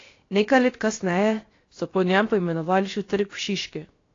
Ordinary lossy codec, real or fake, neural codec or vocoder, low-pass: AAC, 32 kbps; fake; codec, 16 kHz, 0.7 kbps, FocalCodec; 7.2 kHz